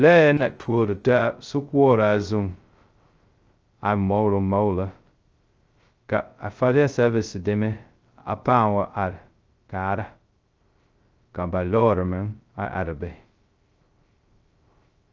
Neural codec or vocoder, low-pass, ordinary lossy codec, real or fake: codec, 16 kHz, 0.2 kbps, FocalCodec; 7.2 kHz; Opus, 24 kbps; fake